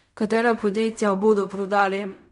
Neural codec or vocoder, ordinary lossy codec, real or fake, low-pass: codec, 16 kHz in and 24 kHz out, 0.4 kbps, LongCat-Audio-Codec, fine tuned four codebook decoder; MP3, 96 kbps; fake; 10.8 kHz